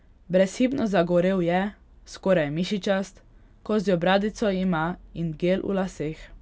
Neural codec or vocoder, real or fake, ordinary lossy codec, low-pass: none; real; none; none